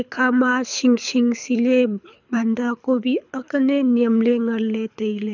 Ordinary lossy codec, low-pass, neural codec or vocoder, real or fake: none; 7.2 kHz; codec, 24 kHz, 6 kbps, HILCodec; fake